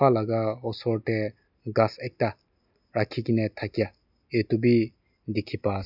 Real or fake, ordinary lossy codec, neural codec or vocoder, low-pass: real; none; none; 5.4 kHz